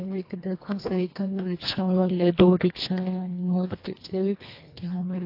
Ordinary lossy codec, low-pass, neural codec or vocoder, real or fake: AAC, 32 kbps; 5.4 kHz; codec, 24 kHz, 1.5 kbps, HILCodec; fake